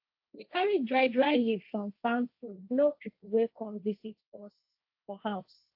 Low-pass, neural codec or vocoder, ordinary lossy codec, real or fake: 5.4 kHz; codec, 16 kHz, 1.1 kbps, Voila-Tokenizer; AAC, 48 kbps; fake